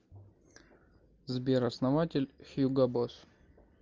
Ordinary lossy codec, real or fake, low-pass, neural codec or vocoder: Opus, 24 kbps; real; 7.2 kHz; none